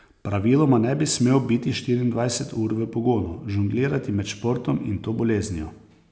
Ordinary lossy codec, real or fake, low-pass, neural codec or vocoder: none; real; none; none